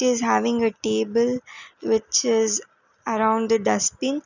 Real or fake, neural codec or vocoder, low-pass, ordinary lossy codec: real; none; 7.2 kHz; none